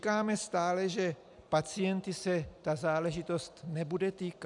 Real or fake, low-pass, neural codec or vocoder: real; 10.8 kHz; none